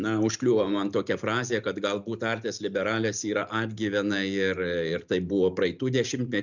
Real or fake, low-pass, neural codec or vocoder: real; 7.2 kHz; none